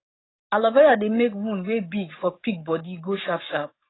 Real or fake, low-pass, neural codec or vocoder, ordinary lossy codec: fake; 7.2 kHz; codec, 44.1 kHz, 7.8 kbps, DAC; AAC, 16 kbps